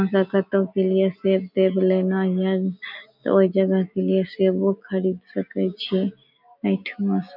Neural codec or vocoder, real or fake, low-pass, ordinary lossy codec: none; real; 5.4 kHz; none